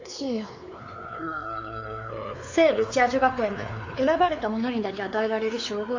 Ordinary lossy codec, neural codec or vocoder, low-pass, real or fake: AAC, 48 kbps; codec, 16 kHz, 4 kbps, X-Codec, WavLM features, trained on Multilingual LibriSpeech; 7.2 kHz; fake